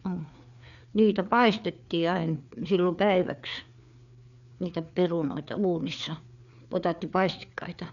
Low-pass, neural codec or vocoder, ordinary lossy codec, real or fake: 7.2 kHz; codec, 16 kHz, 4 kbps, FreqCodec, larger model; none; fake